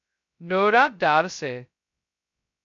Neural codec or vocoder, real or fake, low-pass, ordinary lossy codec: codec, 16 kHz, 0.2 kbps, FocalCodec; fake; 7.2 kHz; AAC, 64 kbps